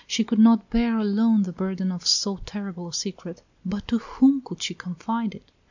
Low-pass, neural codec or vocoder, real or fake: 7.2 kHz; none; real